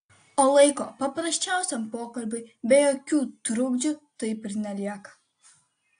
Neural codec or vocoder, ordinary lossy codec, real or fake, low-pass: none; AAC, 48 kbps; real; 9.9 kHz